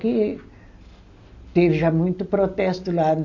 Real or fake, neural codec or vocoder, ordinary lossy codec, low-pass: real; none; AAC, 48 kbps; 7.2 kHz